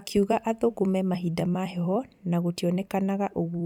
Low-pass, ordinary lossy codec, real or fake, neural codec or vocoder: 19.8 kHz; none; fake; vocoder, 44.1 kHz, 128 mel bands every 256 samples, BigVGAN v2